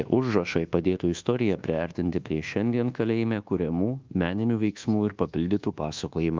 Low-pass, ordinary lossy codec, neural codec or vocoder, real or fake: 7.2 kHz; Opus, 24 kbps; codec, 24 kHz, 1.2 kbps, DualCodec; fake